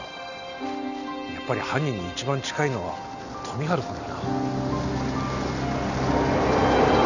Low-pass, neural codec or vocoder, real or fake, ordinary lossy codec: 7.2 kHz; none; real; none